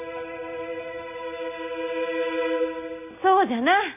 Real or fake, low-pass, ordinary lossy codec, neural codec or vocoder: real; 3.6 kHz; none; none